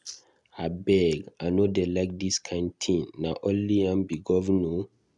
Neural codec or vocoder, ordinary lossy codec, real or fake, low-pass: none; none; real; 10.8 kHz